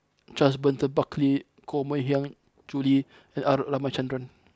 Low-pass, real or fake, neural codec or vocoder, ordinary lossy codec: none; real; none; none